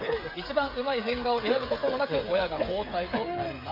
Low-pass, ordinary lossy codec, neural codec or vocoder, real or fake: 5.4 kHz; AAC, 32 kbps; codec, 16 kHz, 16 kbps, FreqCodec, smaller model; fake